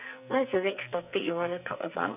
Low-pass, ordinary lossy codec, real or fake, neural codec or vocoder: 3.6 kHz; none; fake; codec, 44.1 kHz, 2.6 kbps, SNAC